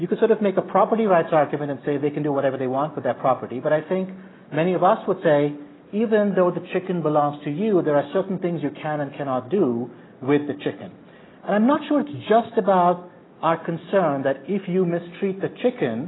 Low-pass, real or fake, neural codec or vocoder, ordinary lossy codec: 7.2 kHz; real; none; AAC, 16 kbps